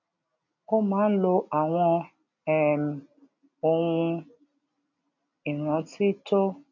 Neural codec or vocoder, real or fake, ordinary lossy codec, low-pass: none; real; none; 7.2 kHz